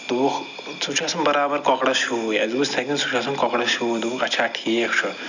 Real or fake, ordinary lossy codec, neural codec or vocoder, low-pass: real; none; none; 7.2 kHz